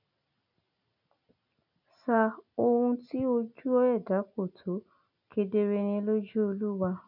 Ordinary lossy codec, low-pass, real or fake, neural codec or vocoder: none; 5.4 kHz; real; none